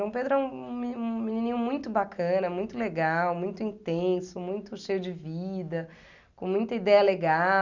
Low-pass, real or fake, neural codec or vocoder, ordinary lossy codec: 7.2 kHz; real; none; none